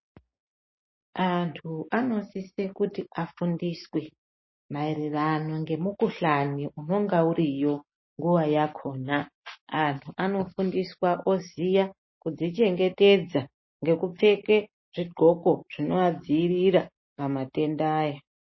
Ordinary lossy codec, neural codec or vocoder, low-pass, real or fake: MP3, 24 kbps; none; 7.2 kHz; real